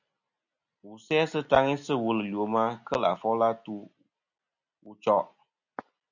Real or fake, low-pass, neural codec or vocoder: real; 7.2 kHz; none